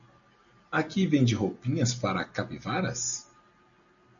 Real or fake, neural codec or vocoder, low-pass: real; none; 7.2 kHz